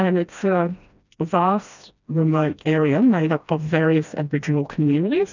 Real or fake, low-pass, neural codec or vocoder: fake; 7.2 kHz; codec, 16 kHz, 1 kbps, FreqCodec, smaller model